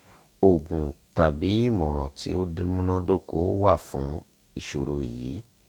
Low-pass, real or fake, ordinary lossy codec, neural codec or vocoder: 19.8 kHz; fake; none; codec, 44.1 kHz, 2.6 kbps, DAC